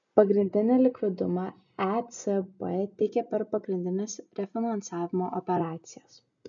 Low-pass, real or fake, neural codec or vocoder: 7.2 kHz; real; none